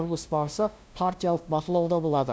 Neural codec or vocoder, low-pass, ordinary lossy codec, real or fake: codec, 16 kHz, 0.5 kbps, FunCodec, trained on LibriTTS, 25 frames a second; none; none; fake